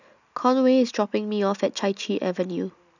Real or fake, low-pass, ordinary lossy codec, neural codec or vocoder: real; 7.2 kHz; none; none